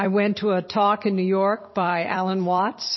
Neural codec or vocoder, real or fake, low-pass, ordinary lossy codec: vocoder, 44.1 kHz, 128 mel bands every 256 samples, BigVGAN v2; fake; 7.2 kHz; MP3, 24 kbps